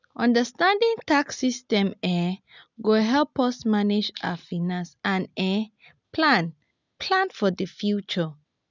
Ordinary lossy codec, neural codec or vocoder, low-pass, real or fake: none; none; 7.2 kHz; real